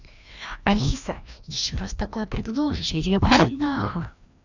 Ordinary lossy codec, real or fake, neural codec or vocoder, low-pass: none; fake; codec, 16 kHz, 1 kbps, FreqCodec, larger model; 7.2 kHz